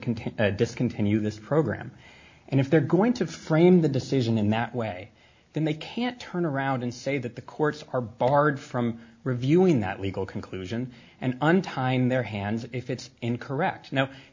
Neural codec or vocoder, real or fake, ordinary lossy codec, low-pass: none; real; MP3, 48 kbps; 7.2 kHz